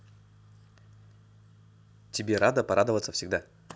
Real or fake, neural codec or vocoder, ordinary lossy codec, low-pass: real; none; none; none